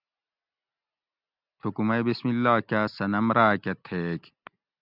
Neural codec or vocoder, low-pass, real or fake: none; 5.4 kHz; real